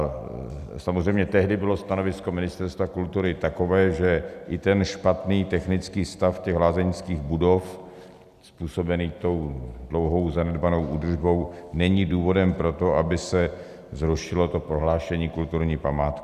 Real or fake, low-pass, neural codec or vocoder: real; 14.4 kHz; none